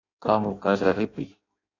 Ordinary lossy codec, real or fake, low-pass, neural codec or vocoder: AAC, 32 kbps; fake; 7.2 kHz; codec, 16 kHz in and 24 kHz out, 0.6 kbps, FireRedTTS-2 codec